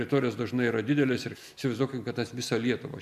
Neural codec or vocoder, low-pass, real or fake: none; 14.4 kHz; real